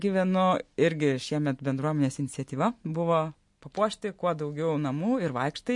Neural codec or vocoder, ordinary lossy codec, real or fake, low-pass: none; MP3, 48 kbps; real; 10.8 kHz